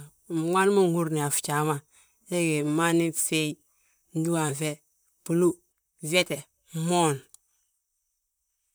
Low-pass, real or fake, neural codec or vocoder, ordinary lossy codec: none; fake; vocoder, 44.1 kHz, 128 mel bands, Pupu-Vocoder; none